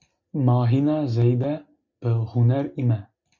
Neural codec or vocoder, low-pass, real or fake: none; 7.2 kHz; real